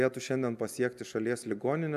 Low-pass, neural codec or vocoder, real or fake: 14.4 kHz; none; real